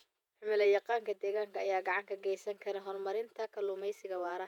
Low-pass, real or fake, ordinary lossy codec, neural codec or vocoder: 19.8 kHz; fake; none; vocoder, 48 kHz, 128 mel bands, Vocos